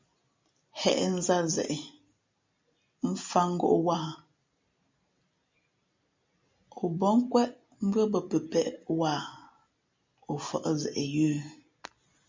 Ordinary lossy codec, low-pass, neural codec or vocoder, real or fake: MP3, 64 kbps; 7.2 kHz; none; real